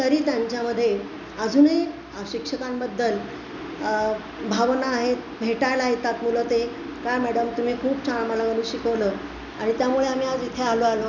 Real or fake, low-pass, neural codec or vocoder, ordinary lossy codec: real; 7.2 kHz; none; none